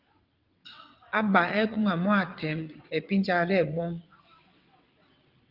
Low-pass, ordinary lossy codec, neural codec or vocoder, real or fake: 5.4 kHz; Opus, 24 kbps; vocoder, 22.05 kHz, 80 mel bands, WaveNeXt; fake